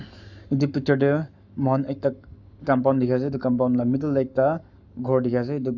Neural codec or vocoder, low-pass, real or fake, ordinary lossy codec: codec, 44.1 kHz, 7.8 kbps, Pupu-Codec; 7.2 kHz; fake; none